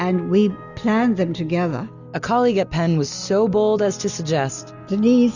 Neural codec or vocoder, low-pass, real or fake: none; 7.2 kHz; real